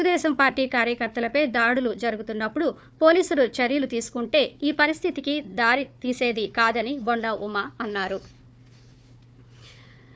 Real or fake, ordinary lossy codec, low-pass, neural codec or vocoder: fake; none; none; codec, 16 kHz, 4 kbps, FunCodec, trained on Chinese and English, 50 frames a second